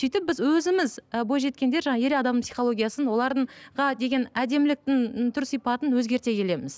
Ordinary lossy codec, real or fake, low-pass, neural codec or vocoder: none; real; none; none